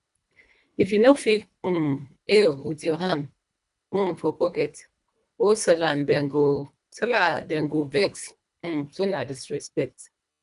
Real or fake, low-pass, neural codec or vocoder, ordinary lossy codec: fake; 10.8 kHz; codec, 24 kHz, 1.5 kbps, HILCodec; none